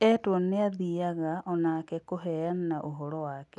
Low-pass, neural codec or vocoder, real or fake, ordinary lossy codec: 10.8 kHz; none; real; none